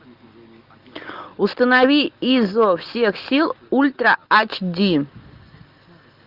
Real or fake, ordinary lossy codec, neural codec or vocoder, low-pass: real; Opus, 32 kbps; none; 5.4 kHz